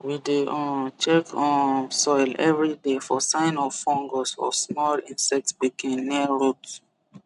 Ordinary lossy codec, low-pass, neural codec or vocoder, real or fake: none; 10.8 kHz; none; real